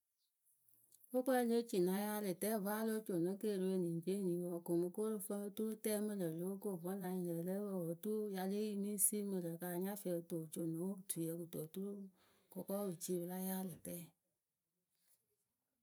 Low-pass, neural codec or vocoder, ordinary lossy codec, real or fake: none; vocoder, 44.1 kHz, 128 mel bands every 512 samples, BigVGAN v2; none; fake